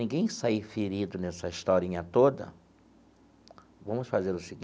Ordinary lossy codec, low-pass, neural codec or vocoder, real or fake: none; none; none; real